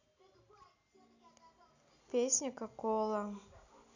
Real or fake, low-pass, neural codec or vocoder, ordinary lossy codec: real; 7.2 kHz; none; none